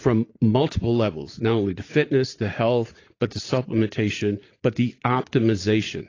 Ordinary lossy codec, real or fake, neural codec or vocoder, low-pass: AAC, 32 kbps; fake; vocoder, 44.1 kHz, 80 mel bands, Vocos; 7.2 kHz